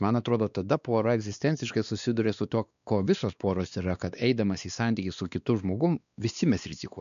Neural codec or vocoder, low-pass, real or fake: codec, 16 kHz, 2 kbps, X-Codec, WavLM features, trained on Multilingual LibriSpeech; 7.2 kHz; fake